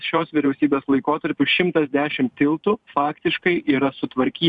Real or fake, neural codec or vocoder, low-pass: fake; vocoder, 44.1 kHz, 128 mel bands every 512 samples, BigVGAN v2; 10.8 kHz